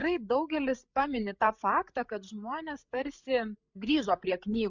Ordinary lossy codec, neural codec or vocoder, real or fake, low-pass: MP3, 64 kbps; codec, 16 kHz, 8 kbps, FreqCodec, larger model; fake; 7.2 kHz